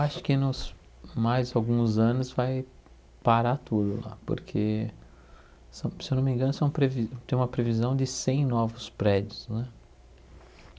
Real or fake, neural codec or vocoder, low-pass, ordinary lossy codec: real; none; none; none